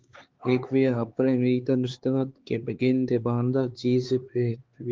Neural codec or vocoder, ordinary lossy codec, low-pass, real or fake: codec, 16 kHz, 4 kbps, X-Codec, HuBERT features, trained on LibriSpeech; Opus, 16 kbps; 7.2 kHz; fake